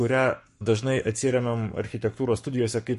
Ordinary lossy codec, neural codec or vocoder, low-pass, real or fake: MP3, 48 kbps; codec, 44.1 kHz, 7.8 kbps, Pupu-Codec; 14.4 kHz; fake